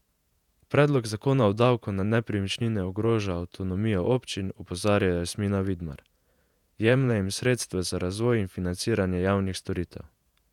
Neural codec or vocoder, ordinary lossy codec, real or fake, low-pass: vocoder, 48 kHz, 128 mel bands, Vocos; none; fake; 19.8 kHz